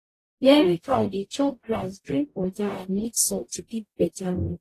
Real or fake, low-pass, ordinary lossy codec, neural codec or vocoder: fake; 14.4 kHz; AAC, 48 kbps; codec, 44.1 kHz, 0.9 kbps, DAC